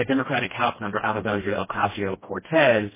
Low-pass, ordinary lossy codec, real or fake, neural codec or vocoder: 3.6 kHz; MP3, 16 kbps; fake; codec, 16 kHz, 1 kbps, FreqCodec, smaller model